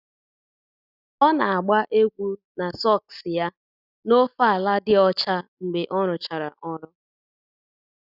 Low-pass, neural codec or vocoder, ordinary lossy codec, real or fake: 5.4 kHz; none; none; real